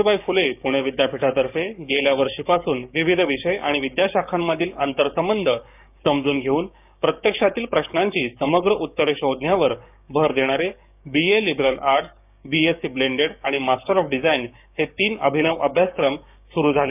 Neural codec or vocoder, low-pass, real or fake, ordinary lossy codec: codec, 16 kHz, 6 kbps, DAC; 3.6 kHz; fake; none